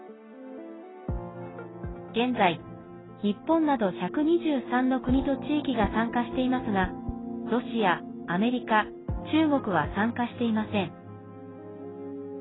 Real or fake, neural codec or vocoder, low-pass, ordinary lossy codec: real; none; 7.2 kHz; AAC, 16 kbps